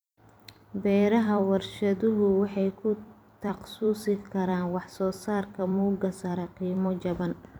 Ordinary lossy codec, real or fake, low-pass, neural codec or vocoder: none; fake; none; vocoder, 44.1 kHz, 128 mel bands every 256 samples, BigVGAN v2